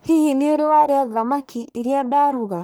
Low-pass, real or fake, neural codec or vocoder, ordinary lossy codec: none; fake; codec, 44.1 kHz, 1.7 kbps, Pupu-Codec; none